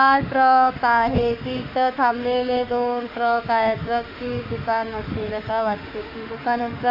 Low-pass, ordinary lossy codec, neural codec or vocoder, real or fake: 5.4 kHz; none; autoencoder, 48 kHz, 32 numbers a frame, DAC-VAE, trained on Japanese speech; fake